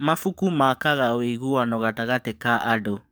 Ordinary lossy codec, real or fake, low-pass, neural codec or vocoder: none; fake; none; codec, 44.1 kHz, 7.8 kbps, DAC